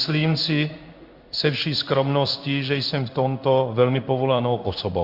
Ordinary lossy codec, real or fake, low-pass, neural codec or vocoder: Opus, 64 kbps; fake; 5.4 kHz; codec, 16 kHz in and 24 kHz out, 1 kbps, XY-Tokenizer